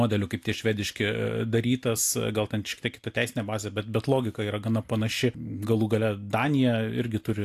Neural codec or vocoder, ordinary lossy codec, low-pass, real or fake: none; AAC, 64 kbps; 14.4 kHz; real